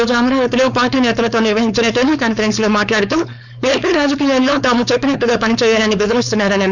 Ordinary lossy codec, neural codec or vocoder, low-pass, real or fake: none; codec, 16 kHz, 4.8 kbps, FACodec; 7.2 kHz; fake